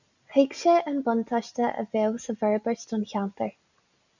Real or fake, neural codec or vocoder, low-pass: real; none; 7.2 kHz